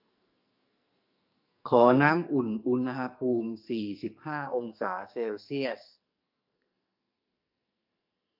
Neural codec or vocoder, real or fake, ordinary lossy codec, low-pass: codec, 44.1 kHz, 2.6 kbps, SNAC; fake; AAC, 48 kbps; 5.4 kHz